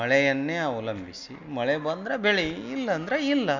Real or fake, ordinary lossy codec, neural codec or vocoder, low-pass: real; MP3, 64 kbps; none; 7.2 kHz